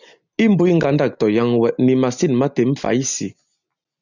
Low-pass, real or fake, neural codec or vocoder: 7.2 kHz; real; none